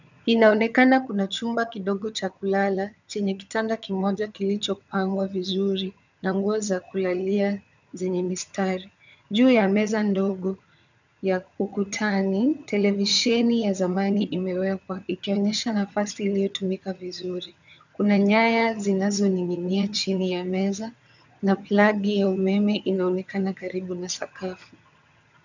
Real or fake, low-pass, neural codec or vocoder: fake; 7.2 kHz; vocoder, 22.05 kHz, 80 mel bands, HiFi-GAN